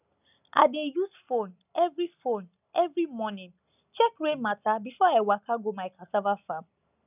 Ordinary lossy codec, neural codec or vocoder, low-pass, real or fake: none; none; 3.6 kHz; real